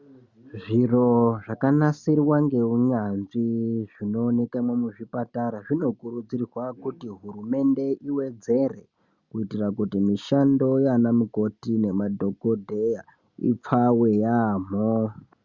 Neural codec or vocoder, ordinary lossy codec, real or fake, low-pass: none; Opus, 64 kbps; real; 7.2 kHz